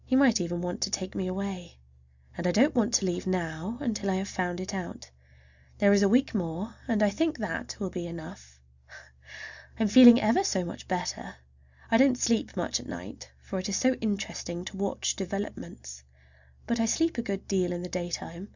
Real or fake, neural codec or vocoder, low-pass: real; none; 7.2 kHz